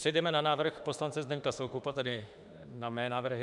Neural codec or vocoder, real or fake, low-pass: autoencoder, 48 kHz, 32 numbers a frame, DAC-VAE, trained on Japanese speech; fake; 10.8 kHz